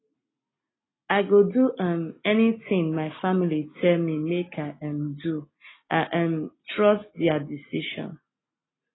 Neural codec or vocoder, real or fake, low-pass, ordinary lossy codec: none; real; 7.2 kHz; AAC, 16 kbps